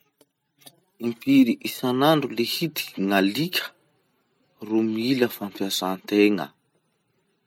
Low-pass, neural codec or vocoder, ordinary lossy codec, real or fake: 19.8 kHz; none; none; real